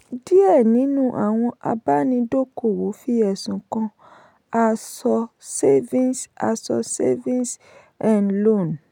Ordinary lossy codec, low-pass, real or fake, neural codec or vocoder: none; 19.8 kHz; real; none